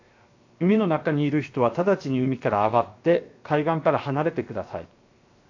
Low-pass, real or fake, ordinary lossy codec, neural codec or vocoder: 7.2 kHz; fake; AAC, 48 kbps; codec, 16 kHz, 0.7 kbps, FocalCodec